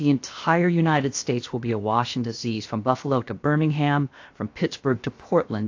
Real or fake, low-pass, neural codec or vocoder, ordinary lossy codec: fake; 7.2 kHz; codec, 16 kHz, 0.7 kbps, FocalCodec; AAC, 48 kbps